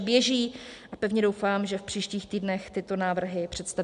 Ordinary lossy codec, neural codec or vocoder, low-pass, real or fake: MP3, 64 kbps; none; 10.8 kHz; real